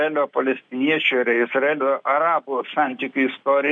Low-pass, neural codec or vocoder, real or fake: 9.9 kHz; none; real